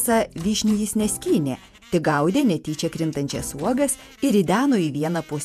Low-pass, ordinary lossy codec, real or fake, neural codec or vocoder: 14.4 kHz; MP3, 96 kbps; fake; vocoder, 48 kHz, 128 mel bands, Vocos